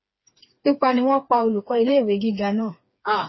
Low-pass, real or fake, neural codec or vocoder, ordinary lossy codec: 7.2 kHz; fake; codec, 16 kHz, 4 kbps, FreqCodec, smaller model; MP3, 24 kbps